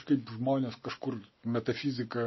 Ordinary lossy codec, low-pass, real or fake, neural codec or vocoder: MP3, 24 kbps; 7.2 kHz; real; none